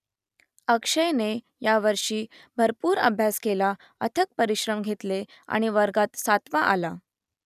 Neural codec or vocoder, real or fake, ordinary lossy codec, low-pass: none; real; none; 14.4 kHz